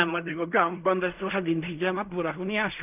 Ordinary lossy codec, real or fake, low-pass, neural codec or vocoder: none; fake; 3.6 kHz; codec, 16 kHz in and 24 kHz out, 0.4 kbps, LongCat-Audio-Codec, fine tuned four codebook decoder